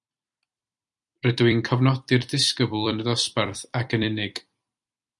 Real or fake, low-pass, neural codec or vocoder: fake; 10.8 kHz; vocoder, 44.1 kHz, 128 mel bands every 256 samples, BigVGAN v2